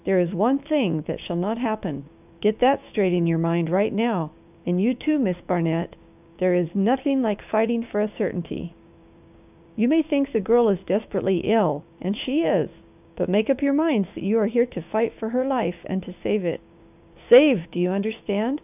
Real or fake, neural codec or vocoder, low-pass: fake; codec, 16 kHz, 6 kbps, DAC; 3.6 kHz